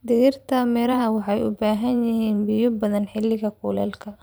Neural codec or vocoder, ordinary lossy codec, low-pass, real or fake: vocoder, 44.1 kHz, 128 mel bands every 512 samples, BigVGAN v2; none; none; fake